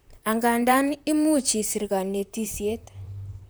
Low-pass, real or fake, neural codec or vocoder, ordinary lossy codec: none; fake; vocoder, 44.1 kHz, 128 mel bands, Pupu-Vocoder; none